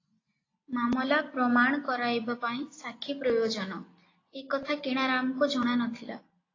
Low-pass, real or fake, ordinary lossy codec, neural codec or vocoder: 7.2 kHz; real; AAC, 32 kbps; none